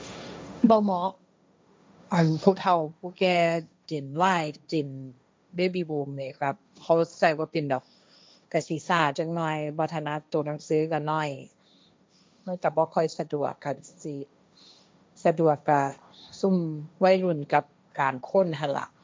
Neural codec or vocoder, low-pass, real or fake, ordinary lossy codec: codec, 16 kHz, 1.1 kbps, Voila-Tokenizer; none; fake; none